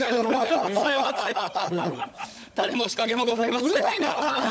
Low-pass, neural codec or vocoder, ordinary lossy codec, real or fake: none; codec, 16 kHz, 16 kbps, FunCodec, trained on LibriTTS, 50 frames a second; none; fake